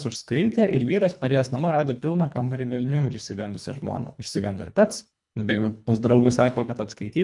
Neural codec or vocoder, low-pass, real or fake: codec, 24 kHz, 1.5 kbps, HILCodec; 10.8 kHz; fake